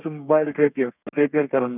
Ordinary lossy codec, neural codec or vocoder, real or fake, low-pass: none; codec, 32 kHz, 1.9 kbps, SNAC; fake; 3.6 kHz